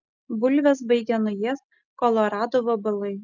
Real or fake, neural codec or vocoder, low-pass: real; none; 7.2 kHz